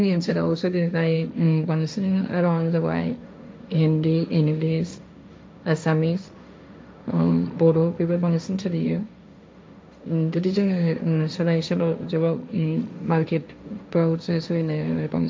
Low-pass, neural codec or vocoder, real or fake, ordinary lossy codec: none; codec, 16 kHz, 1.1 kbps, Voila-Tokenizer; fake; none